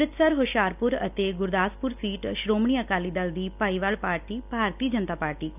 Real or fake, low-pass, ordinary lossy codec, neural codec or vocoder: real; 3.6 kHz; none; none